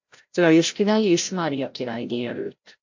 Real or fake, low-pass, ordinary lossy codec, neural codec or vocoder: fake; 7.2 kHz; MP3, 48 kbps; codec, 16 kHz, 0.5 kbps, FreqCodec, larger model